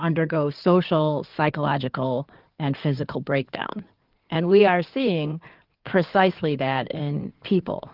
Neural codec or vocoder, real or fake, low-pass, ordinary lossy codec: codec, 16 kHz in and 24 kHz out, 2.2 kbps, FireRedTTS-2 codec; fake; 5.4 kHz; Opus, 32 kbps